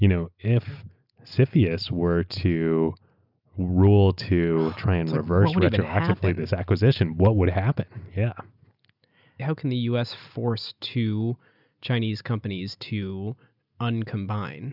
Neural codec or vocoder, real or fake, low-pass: none; real; 5.4 kHz